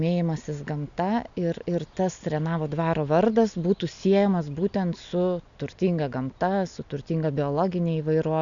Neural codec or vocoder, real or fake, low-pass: none; real; 7.2 kHz